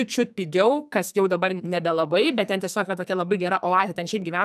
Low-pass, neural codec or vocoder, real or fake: 14.4 kHz; codec, 44.1 kHz, 2.6 kbps, SNAC; fake